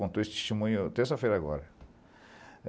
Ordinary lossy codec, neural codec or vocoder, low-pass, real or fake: none; none; none; real